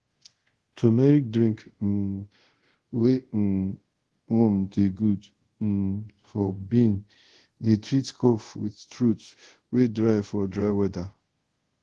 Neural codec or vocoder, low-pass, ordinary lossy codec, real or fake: codec, 24 kHz, 0.5 kbps, DualCodec; 10.8 kHz; Opus, 16 kbps; fake